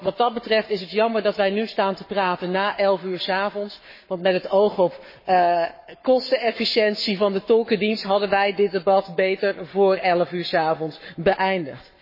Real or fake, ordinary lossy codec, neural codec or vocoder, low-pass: fake; MP3, 24 kbps; codec, 44.1 kHz, 7.8 kbps, Pupu-Codec; 5.4 kHz